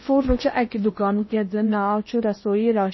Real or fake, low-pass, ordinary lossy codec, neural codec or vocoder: fake; 7.2 kHz; MP3, 24 kbps; codec, 16 kHz in and 24 kHz out, 0.8 kbps, FocalCodec, streaming, 65536 codes